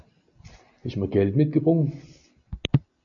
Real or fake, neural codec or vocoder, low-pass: real; none; 7.2 kHz